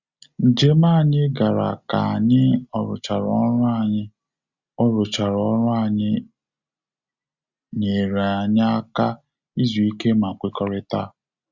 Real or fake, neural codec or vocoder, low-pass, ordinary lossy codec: real; none; 7.2 kHz; Opus, 64 kbps